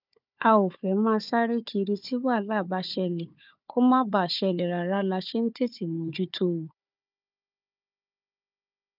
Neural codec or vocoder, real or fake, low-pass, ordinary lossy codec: codec, 16 kHz, 4 kbps, FunCodec, trained on Chinese and English, 50 frames a second; fake; 5.4 kHz; none